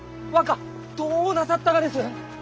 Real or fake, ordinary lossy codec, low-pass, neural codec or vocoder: real; none; none; none